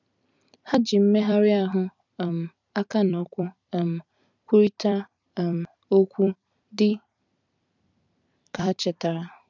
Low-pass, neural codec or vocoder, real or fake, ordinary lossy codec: 7.2 kHz; vocoder, 44.1 kHz, 128 mel bands every 256 samples, BigVGAN v2; fake; none